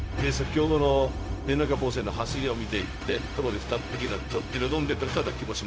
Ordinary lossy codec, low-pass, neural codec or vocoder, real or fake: none; none; codec, 16 kHz, 0.4 kbps, LongCat-Audio-Codec; fake